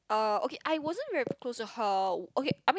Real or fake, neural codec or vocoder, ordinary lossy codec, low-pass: real; none; none; none